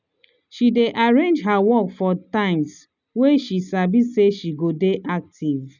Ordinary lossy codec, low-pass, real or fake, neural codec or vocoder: none; 7.2 kHz; real; none